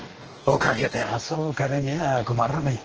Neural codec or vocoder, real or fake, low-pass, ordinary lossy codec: codec, 44.1 kHz, 2.6 kbps, DAC; fake; 7.2 kHz; Opus, 16 kbps